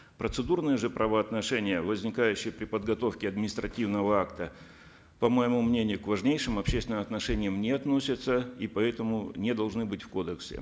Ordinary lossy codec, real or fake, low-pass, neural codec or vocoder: none; real; none; none